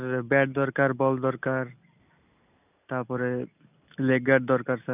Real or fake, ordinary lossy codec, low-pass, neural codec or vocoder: real; none; 3.6 kHz; none